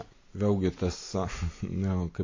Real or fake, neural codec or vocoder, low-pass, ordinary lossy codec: real; none; 7.2 kHz; MP3, 32 kbps